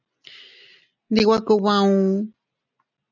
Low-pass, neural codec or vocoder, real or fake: 7.2 kHz; none; real